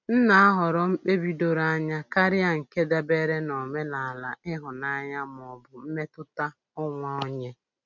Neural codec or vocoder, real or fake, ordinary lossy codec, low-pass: none; real; none; 7.2 kHz